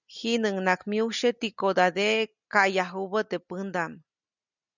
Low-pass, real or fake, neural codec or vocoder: 7.2 kHz; real; none